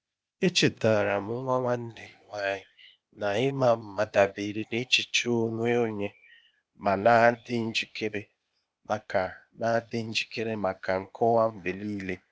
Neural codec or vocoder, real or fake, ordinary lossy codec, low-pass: codec, 16 kHz, 0.8 kbps, ZipCodec; fake; none; none